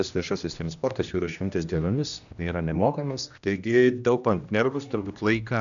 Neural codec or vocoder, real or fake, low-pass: codec, 16 kHz, 1 kbps, X-Codec, HuBERT features, trained on general audio; fake; 7.2 kHz